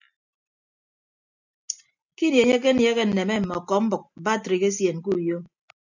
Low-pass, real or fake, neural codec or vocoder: 7.2 kHz; real; none